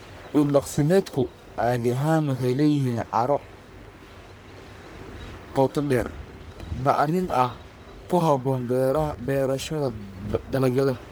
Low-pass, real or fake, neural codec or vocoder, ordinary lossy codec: none; fake; codec, 44.1 kHz, 1.7 kbps, Pupu-Codec; none